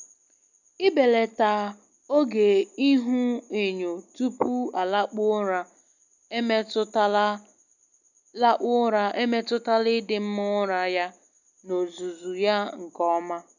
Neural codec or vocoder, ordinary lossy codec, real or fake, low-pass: none; none; real; 7.2 kHz